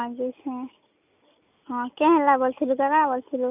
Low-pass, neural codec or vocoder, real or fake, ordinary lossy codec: 3.6 kHz; none; real; none